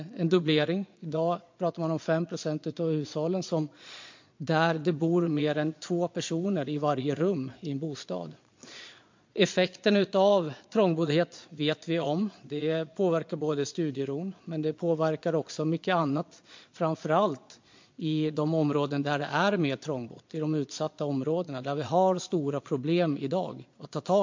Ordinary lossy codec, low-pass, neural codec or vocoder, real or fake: MP3, 48 kbps; 7.2 kHz; vocoder, 22.05 kHz, 80 mel bands, Vocos; fake